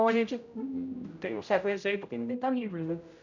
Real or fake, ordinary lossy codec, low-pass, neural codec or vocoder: fake; none; 7.2 kHz; codec, 16 kHz, 0.5 kbps, X-Codec, HuBERT features, trained on general audio